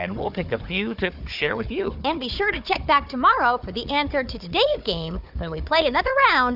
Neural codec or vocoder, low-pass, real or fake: codec, 16 kHz, 4 kbps, FunCodec, trained on Chinese and English, 50 frames a second; 5.4 kHz; fake